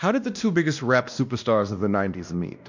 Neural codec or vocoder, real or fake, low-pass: codec, 16 kHz, 1 kbps, X-Codec, WavLM features, trained on Multilingual LibriSpeech; fake; 7.2 kHz